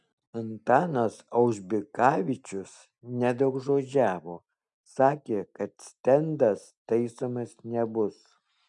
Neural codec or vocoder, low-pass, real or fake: none; 10.8 kHz; real